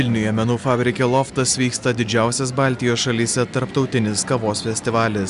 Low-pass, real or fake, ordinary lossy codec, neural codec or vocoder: 10.8 kHz; real; MP3, 96 kbps; none